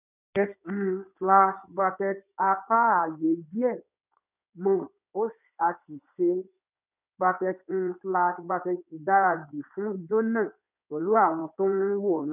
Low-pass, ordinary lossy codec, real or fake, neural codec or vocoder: 3.6 kHz; none; fake; codec, 16 kHz in and 24 kHz out, 2.2 kbps, FireRedTTS-2 codec